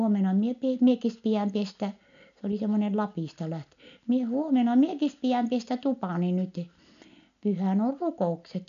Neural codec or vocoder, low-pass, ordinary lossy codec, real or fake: none; 7.2 kHz; none; real